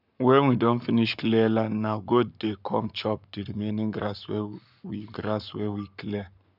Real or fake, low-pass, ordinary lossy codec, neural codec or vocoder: fake; 5.4 kHz; none; codec, 44.1 kHz, 7.8 kbps, Pupu-Codec